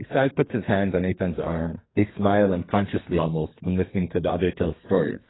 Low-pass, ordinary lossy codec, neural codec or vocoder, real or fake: 7.2 kHz; AAC, 16 kbps; codec, 32 kHz, 1.9 kbps, SNAC; fake